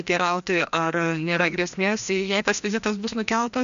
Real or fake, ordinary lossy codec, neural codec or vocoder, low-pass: fake; AAC, 96 kbps; codec, 16 kHz, 1 kbps, FreqCodec, larger model; 7.2 kHz